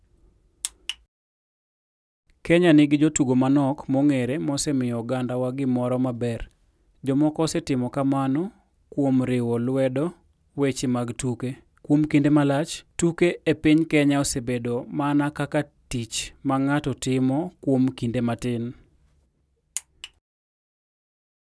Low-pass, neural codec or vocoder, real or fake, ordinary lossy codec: none; none; real; none